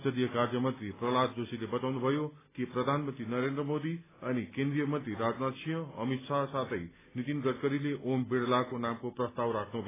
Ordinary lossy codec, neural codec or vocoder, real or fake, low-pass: AAC, 16 kbps; none; real; 3.6 kHz